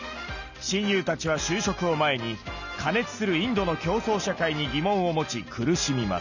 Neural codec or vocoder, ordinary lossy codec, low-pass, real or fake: none; none; 7.2 kHz; real